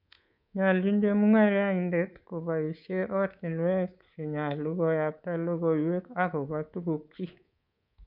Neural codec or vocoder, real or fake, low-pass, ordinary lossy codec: codec, 24 kHz, 3.1 kbps, DualCodec; fake; 5.4 kHz; none